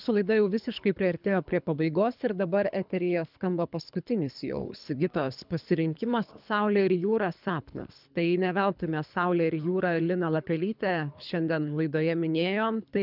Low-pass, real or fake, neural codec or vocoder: 5.4 kHz; fake; codec, 24 kHz, 3 kbps, HILCodec